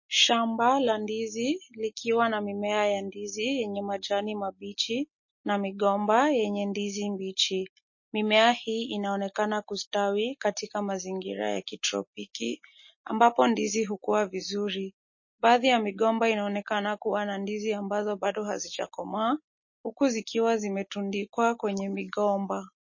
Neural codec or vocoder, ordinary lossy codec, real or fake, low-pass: none; MP3, 32 kbps; real; 7.2 kHz